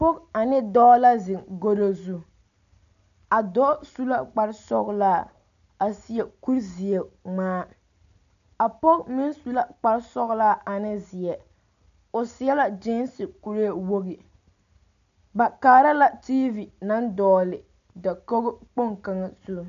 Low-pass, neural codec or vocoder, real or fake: 7.2 kHz; none; real